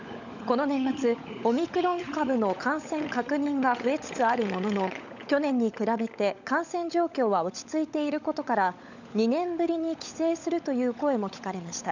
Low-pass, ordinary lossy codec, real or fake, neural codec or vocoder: 7.2 kHz; none; fake; codec, 16 kHz, 16 kbps, FunCodec, trained on LibriTTS, 50 frames a second